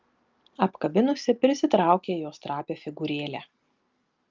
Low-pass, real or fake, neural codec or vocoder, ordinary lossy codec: 7.2 kHz; real; none; Opus, 24 kbps